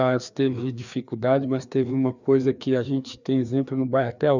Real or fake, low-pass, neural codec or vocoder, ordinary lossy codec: fake; 7.2 kHz; codec, 16 kHz, 2 kbps, FreqCodec, larger model; none